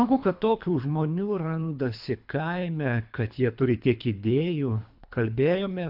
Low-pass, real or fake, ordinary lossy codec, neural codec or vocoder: 5.4 kHz; fake; AAC, 48 kbps; codec, 24 kHz, 3 kbps, HILCodec